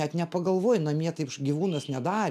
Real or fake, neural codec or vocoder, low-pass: real; none; 14.4 kHz